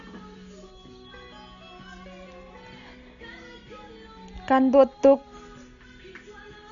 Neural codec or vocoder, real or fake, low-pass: none; real; 7.2 kHz